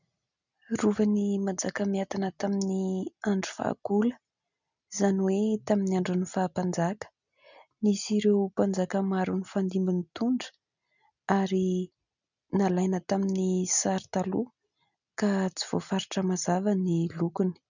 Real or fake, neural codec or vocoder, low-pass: real; none; 7.2 kHz